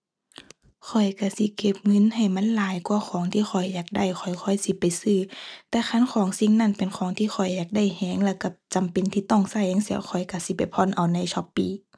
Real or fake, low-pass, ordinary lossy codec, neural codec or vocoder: real; none; none; none